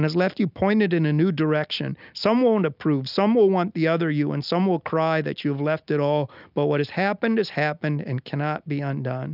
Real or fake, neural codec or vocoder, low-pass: real; none; 5.4 kHz